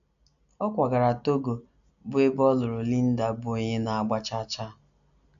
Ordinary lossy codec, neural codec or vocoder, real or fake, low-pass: AAC, 96 kbps; none; real; 7.2 kHz